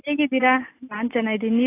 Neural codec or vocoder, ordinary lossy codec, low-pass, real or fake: none; AAC, 16 kbps; 3.6 kHz; real